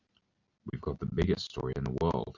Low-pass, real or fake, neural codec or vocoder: 7.2 kHz; real; none